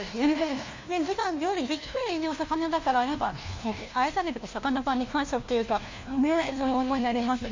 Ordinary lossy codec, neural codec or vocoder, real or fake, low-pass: MP3, 64 kbps; codec, 16 kHz, 1 kbps, FunCodec, trained on LibriTTS, 50 frames a second; fake; 7.2 kHz